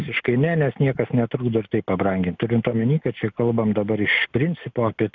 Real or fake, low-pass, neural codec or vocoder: real; 7.2 kHz; none